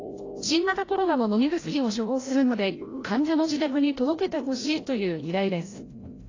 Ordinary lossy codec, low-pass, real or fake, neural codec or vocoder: AAC, 32 kbps; 7.2 kHz; fake; codec, 16 kHz, 0.5 kbps, FreqCodec, larger model